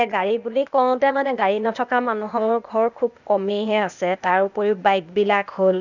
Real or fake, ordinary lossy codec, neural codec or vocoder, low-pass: fake; none; codec, 16 kHz, 0.8 kbps, ZipCodec; 7.2 kHz